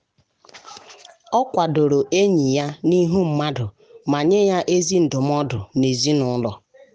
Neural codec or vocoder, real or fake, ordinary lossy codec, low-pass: none; real; none; none